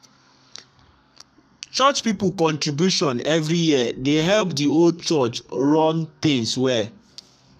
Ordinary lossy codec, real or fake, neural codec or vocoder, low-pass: none; fake; codec, 32 kHz, 1.9 kbps, SNAC; 14.4 kHz